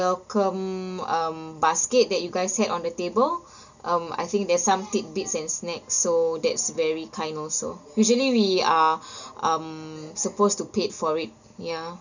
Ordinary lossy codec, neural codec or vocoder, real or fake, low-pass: none; none; real; 7.2 kHz